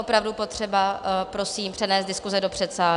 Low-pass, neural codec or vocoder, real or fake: 10.8 kHz; none; real